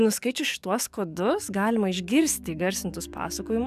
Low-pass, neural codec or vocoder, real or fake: 14.4 kHz; codec, 44.1 kHz, 7.8 kbps, DAC; fake